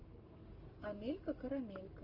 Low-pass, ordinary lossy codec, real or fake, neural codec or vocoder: 5.4 kHz; Opus, 24 kbps; fake; codec, 44.1 kHz, 7.8 kbps, Pupu-Codec